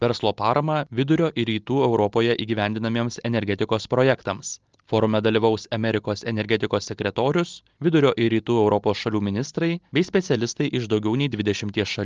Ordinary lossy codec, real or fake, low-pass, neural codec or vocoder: Opus, 24 kbps; real; 7.2 kHz; none